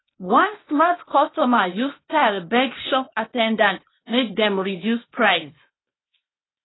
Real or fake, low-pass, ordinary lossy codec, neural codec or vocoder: fake; 7.2 kHz; AAC, 16 kbps; codec, 16 kHz, 0.8 kbps, ZipCodec